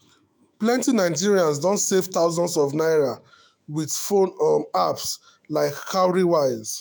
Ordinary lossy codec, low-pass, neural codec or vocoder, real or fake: none; none; autoencoder, 48 kHz, 128 numbers a frame, DAC-VAE, trained on Japanese speech; fake